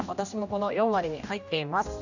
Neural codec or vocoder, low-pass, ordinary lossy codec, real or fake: codec, 16 kHz, 1 kbps, X-Codec, HuBERT features, trained on general audio; 7.2 kHz; none; fake